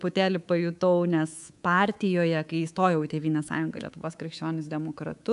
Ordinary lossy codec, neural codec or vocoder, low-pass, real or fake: AAC, 96 kbps; codec, 24 kHz, 3.1 kbps, DualCodec; 10.8 kHz; fake